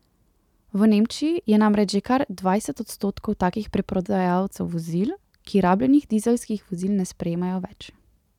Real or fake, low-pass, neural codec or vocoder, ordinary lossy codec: real; 19.8 kHz; none; none